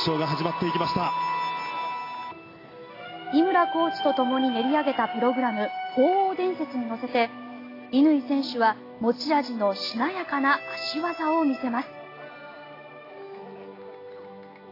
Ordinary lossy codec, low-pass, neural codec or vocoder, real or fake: AAC, 24 kbps; 5.4 kHz; none; real